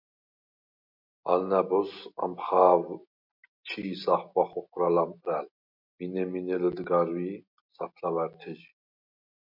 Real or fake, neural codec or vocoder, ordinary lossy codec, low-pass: real; none; MP3, 48 kbps; 5.4 kHz